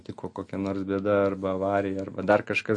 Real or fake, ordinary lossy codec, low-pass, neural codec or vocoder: real; MP3, 48 kbps; 10.8 kHz; none